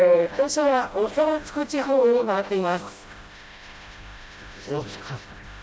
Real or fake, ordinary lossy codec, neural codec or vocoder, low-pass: fake; none; codec, 16 kHz, 0.5 kbps, FreqCodec, smaller model; none